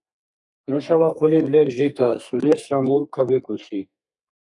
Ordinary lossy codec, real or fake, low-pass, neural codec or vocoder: MP3, 96 kbps; fake; 10.8 kHz; codec, 32 kHz, 1.9 kbps, SNAC